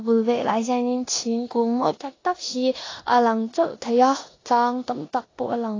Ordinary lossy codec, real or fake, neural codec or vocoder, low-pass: AAC, 32 kbps; fake; codec, 16 kHz in and 24 kHz out, 0.9 kbps, LongCat-Audio-Codec, four codebook decoder; 7.2 kHz